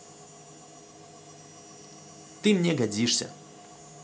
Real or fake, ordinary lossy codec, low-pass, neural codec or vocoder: real; none; none; none